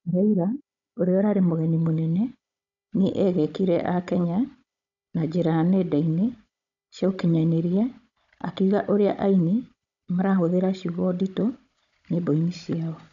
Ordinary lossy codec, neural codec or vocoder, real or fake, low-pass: none; codec, 16 kHz, 16 kbps, FunCodec, trained on Chinese and English, 50 frames a second; fake; 7.2 kHz